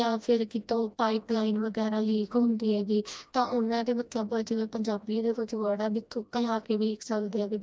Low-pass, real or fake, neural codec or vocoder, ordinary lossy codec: none; fake; codec, 16 kHz, 1 kbps, FreqCodec, smaller model; none